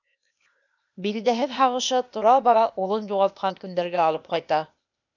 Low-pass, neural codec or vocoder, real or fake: 7.2 kHz; codec, 16 kHz, 0.8 kbps, ZipCodec; fake